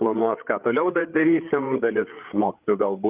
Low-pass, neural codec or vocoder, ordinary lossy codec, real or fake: 3.6 kHz; codec, 16 kHz, 8 kbps, FreqCodec, larger model; Opus, 24 kbps; fake